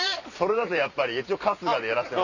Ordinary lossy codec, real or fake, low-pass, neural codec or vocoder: none; fake; 7.2 kHz; vocoder, 44.1 kHz, 128 mel bands every 256 samples, BigVGAN v2